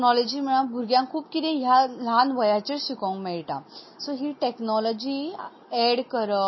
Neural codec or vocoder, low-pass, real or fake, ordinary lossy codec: none; 7.2 kHz; real; MP3, 24 kbps